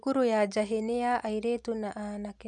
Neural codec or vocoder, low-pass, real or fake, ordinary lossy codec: none; 10.8 kHz; real; none